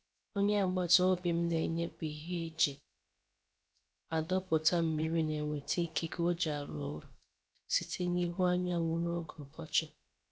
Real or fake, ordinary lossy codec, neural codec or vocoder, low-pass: fake; none; codec, 16 kHz, about 1 kbps, DyCAST, with the encoder's durations; none